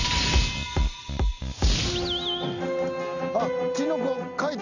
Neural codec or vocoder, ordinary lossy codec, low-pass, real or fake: none; none; 7.2 kHz; real